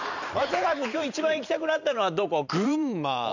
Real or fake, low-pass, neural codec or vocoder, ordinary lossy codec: fake; 7.2 kHz; vocoder, 44.1 kHz, 128 mel bands every 512 samples, BigVGAN v2; none